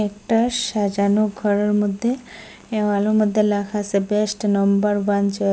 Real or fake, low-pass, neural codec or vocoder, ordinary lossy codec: real; none; none; none